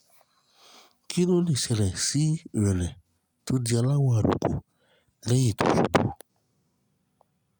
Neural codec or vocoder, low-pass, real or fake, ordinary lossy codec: none; none; real; none